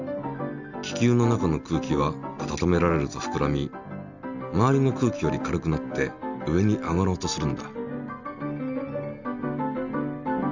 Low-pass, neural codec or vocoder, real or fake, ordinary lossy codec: 7.2 kHz; none; real; none